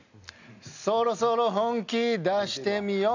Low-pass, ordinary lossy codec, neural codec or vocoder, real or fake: 7.2 kHz; none; none; real